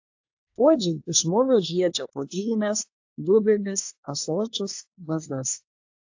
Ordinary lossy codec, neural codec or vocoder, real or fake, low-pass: AAC, 48 kbps; codec, 24 kHz, 1 kbps, SNAC; fake; 7.2 kHz